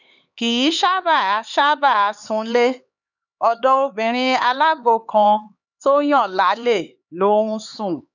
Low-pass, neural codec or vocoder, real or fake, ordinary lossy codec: 7.2 kHz; codec, 16 kHz, 4 kbps, X-Codec, HuBERT features, trained on LibriSpeech; fake; none